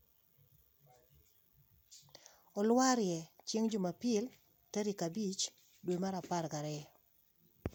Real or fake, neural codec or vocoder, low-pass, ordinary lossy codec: real; none; 19.8 kHz; MP3, 96 kbps